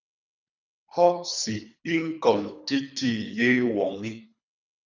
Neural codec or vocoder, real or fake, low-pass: codec, 24 kHz, 3 kbps, HILCodec; fake; 7.2 kHz